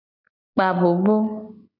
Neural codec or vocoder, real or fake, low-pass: none; real; 5.4 kHz